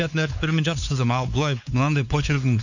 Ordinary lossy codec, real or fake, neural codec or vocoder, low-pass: none; fake; codec, 16 kHz, 4 kbps, X-Codec, WavLM features, trained on Multilingual LibriSpeech; 7.2 kHz